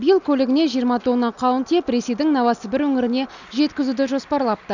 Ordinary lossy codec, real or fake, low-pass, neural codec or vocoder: none; real; 7.2 kHz; none